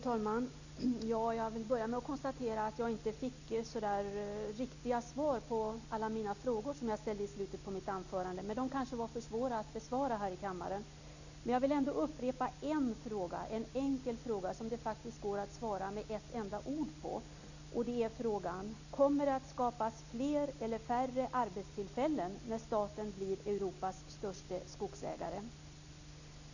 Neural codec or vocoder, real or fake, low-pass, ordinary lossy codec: none; real; 7.2 kHz; none